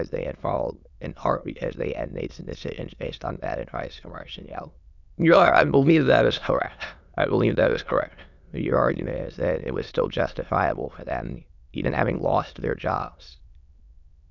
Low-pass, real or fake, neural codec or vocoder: 7.2 kHz; fake; autoencoder, 22.05 kHz, a latent of 192 numbers a frame, VITS, trained on many speakers